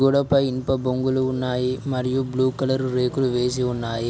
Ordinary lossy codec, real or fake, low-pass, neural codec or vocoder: none; real; none; none